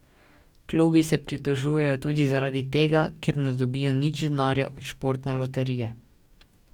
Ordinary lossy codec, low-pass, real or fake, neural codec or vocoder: none; 19.8 kHz; fake; codec, 44.1 kHz, 2.6 kbps, DAC